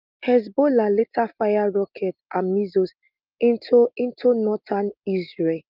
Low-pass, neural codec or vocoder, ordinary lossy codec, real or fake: 5.4 kHz; none; Opus, 24 kbps; real